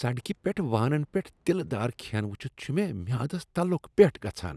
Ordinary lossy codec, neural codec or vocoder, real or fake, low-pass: none; none; real; none